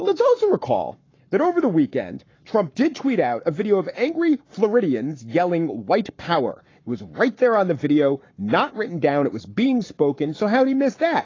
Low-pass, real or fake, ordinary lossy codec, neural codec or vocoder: 7.2 kHz; fake; AAC, 32 kbps; codec, 44.1 kHz, 7.8 kbps, Pupu-Codec